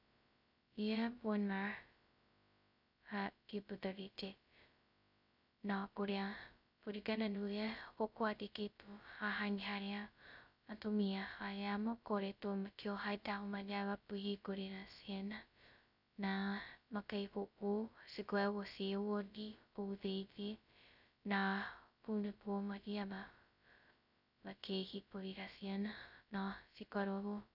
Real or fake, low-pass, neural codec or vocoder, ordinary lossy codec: fake; 5.4 kHz; codec, 16 kHz, 0.2 kbps, FocalCodec; none